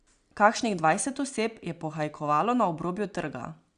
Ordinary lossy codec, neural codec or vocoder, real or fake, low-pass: Opus, 64 kbps; none; real; 9.9 kHz